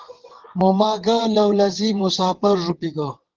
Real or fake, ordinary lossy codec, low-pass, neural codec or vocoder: fake; Opus, 16 kbps; 7.2 kHz; vocoder, 22.05 kHz, 80 mel bands, WaveNeXt